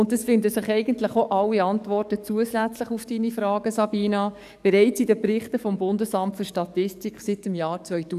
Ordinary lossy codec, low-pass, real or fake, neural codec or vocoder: none; 14.4 kHz; fake; codec, 44.1 kHz, 7.8 kbps, DAC